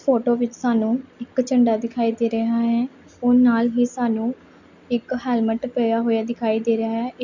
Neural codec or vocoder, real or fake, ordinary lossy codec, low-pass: none; real; none; 7.2 kHz